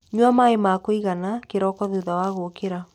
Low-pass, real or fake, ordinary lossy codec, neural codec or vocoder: 19.8 kHz; real; none; none